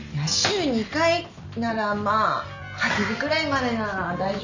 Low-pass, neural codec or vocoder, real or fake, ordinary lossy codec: 7.2 kHz; none; real; none